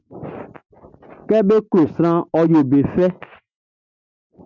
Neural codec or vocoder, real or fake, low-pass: none; real; 7.2 kHz